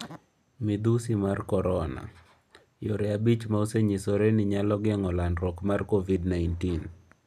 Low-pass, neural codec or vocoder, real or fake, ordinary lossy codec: 14.4 kHz; none; real; none